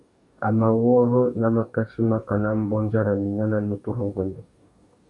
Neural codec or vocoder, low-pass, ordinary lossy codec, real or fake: codec, 44.1 kHz, 2.6 kbps, DAC; 10.8 kHz; AAC, 64 kbps; fake